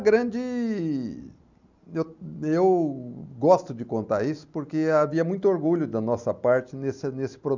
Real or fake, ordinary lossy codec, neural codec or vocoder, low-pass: real; none; none; 7.2 kHz